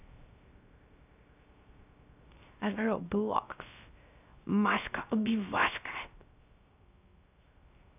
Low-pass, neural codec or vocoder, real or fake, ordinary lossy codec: 3.6 kHz; codec, 16 kHz, 0.3 kbps, FocalCodec; fake; none